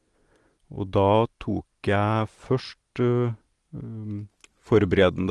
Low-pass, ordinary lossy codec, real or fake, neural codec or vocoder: 10.8 kHz; Opus, 24 kbps; real; none